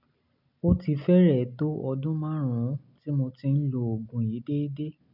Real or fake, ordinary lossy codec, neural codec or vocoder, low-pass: real; none; none; 5.4 kHz